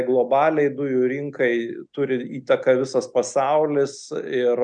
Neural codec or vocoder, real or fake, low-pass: none; real; 9.9 kHz